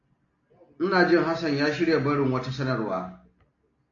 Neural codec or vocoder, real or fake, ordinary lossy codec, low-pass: none; real; AAC, 48 kbps; 7.2 kHz